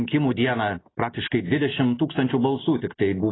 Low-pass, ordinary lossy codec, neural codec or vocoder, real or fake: 7.2 kHz; AAC, 16 kbps; none; real